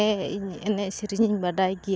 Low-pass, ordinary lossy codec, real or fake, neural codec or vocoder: none; none; real; none